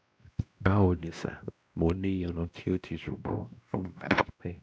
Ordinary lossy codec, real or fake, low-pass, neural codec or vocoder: none; fake; none; codec, 16 kHz, 1 kbps, X-Codec, WavLM features, trained on Multilingual LibriSpeech